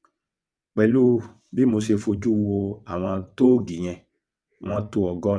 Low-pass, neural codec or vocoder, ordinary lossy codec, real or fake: none; vocoder, 22.05 kHz, 80 mel bands, WaveNeXt; none; fake